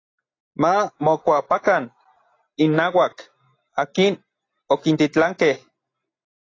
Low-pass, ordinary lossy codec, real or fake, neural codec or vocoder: 7.2 kHz; AAC, 32 kbps; real; none